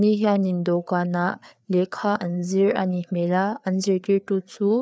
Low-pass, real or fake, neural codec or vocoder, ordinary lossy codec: none; fake; codec, 16 kHz, 4 kbps, FreqCodec, larger model; none